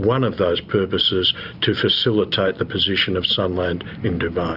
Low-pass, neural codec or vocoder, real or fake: 5.4 kHz; none; real